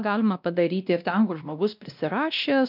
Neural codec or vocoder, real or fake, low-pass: codec, 16 kHz, 1 kbps, X-Codec, WavLM features, trained on Multilingual LibriSpeech; fake; 5.4 kHz